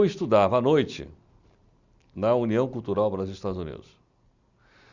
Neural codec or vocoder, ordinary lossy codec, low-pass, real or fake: none; Opus, 64 kbps; 7.2 kHz; real